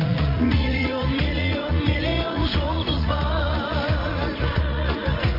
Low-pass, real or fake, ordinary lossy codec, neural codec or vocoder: 5.4 kHz; fake; AAC, 24 kbps; vocoder, 44.1 kHz, 80 mel bands, Vocos